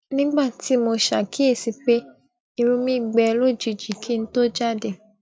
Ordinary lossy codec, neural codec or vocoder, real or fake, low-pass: none; none; real; none